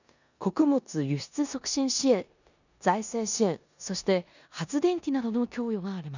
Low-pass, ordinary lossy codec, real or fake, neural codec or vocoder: 7.2 kHz; none; fake; codec, 16 kHz in and 24 kHz out, 0.9 kbps, LongCat-Audio-Codec, four codebook decoder